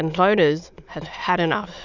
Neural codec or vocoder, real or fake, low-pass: autoencoder, 22.05 kHz, a latent of 192 numbers a frame, VITS, trained on many speakers; fake; 7.2 kHz